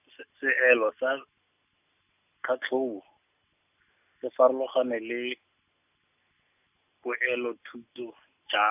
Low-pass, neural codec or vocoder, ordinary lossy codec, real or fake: 3.6 kHz; none; none; real